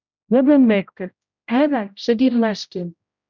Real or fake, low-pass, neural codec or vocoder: fake; 7.2 kHz; codec, 16 kHz, 0.5 kbps, X-Codec, HuBERT features, trained on general audio